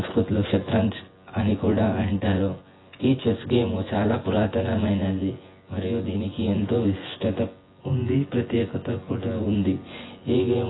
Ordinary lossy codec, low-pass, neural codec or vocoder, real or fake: AAC, 16 kbps; 7.2 kHz; vocoder, 24 kHz, 100 mel bands, Vocos; fake